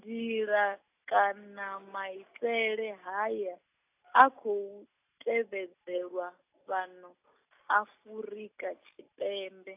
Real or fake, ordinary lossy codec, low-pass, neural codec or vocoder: real; AAC, 32 kbps; 3.6 kHz; none